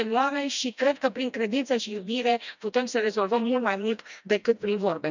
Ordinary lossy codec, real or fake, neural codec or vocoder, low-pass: none; fake; codec, 16 kHz, 1 kbps, FreqCodec, smaller model; 7.2 kHz